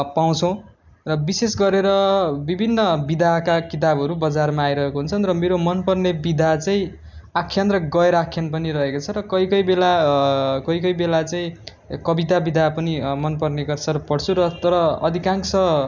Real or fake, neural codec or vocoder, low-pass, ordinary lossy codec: real; none; 7.2 kHz; none